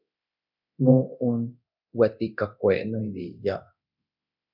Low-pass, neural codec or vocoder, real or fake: 5.4 kHz; codec, 24 kHz, 0.9 kbps, DualCodec; fake